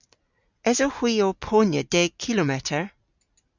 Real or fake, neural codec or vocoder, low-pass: real; none; 7.2 kHz